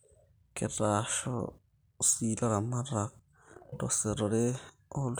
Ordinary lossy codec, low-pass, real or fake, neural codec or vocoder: none; none; real; none